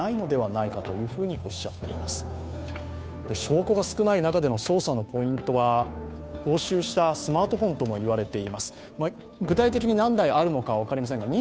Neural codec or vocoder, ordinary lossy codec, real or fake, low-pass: codec, 16 kHz, 2 kbps, FunCodec, trained on Chinese and English, 25 frames a second; none; fake; none